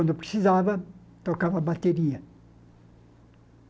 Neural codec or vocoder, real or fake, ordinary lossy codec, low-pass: none; real; none; none